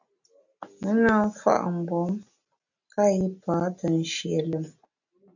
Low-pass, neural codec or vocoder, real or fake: 7.2 kHz; none; real